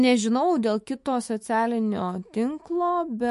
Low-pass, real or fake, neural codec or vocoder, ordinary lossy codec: 14.4 kHz; fake; autoencoder, 48 kHz, 128 numbers a frame, DAC-VAE, trained on Japanese speech; MP3, 48 kbps